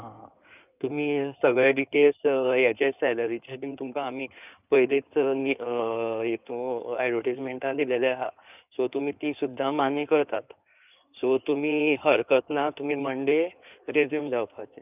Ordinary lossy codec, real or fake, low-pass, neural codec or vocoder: none; fake; 3.6 kHz; codec, 16 kHz in and 24 kHz out, 2.2 kbps, FireRedTTS-2 codec